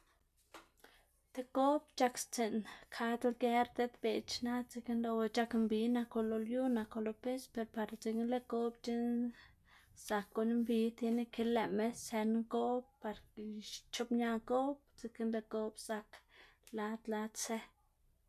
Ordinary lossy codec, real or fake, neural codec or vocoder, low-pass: none; real; none; 14.4 kHz